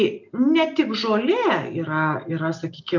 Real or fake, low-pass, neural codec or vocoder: real; 7.2 kHz; none